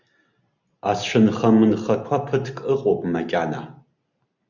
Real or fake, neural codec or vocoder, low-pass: real; none; 7.2 kHz